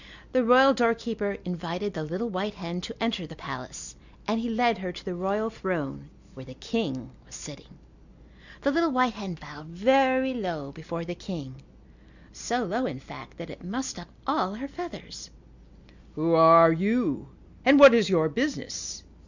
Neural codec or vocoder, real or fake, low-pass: none; real; 7.2 kHz